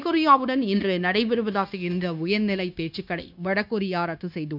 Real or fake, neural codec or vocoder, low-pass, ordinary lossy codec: fake; codec, 16 kHz, 0.9 kbps, LongCat-Audio-Codec; 5.4 kHz; none